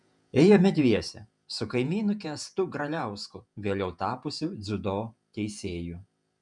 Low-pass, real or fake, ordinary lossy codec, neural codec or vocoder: 10.8 kHz; fake; MP3, 96 kbps; vocoder, 48 kHz, 128 mel bands, Vocos